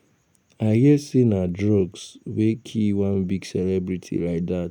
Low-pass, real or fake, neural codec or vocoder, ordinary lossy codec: 19.8 kHz; real; none; none